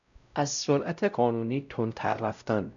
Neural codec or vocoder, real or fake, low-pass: codec, 16 kHz, 0.5 kbps, X-Codec, WavLM features, trained on Multilingual LibriSpeech; fake; 7.2 kHz